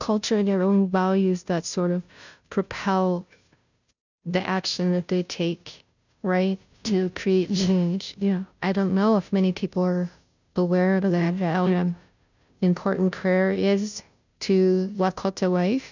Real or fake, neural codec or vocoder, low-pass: fake; codec, 16 kHz, 0.5 kbps, FunCodec, trained on Chinese and English, 25 frames a second; 7.2 kHz